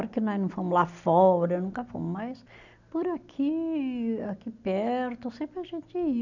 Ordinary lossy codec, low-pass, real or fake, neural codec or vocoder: none; 7.2 kHz; real; none